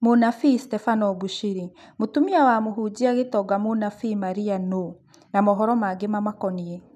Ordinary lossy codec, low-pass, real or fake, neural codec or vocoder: none; 14.4 kHz; real; none